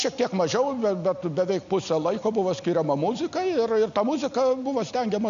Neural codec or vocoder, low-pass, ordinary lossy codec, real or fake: none; 7.2 kHz; AAC, 96 kbps; real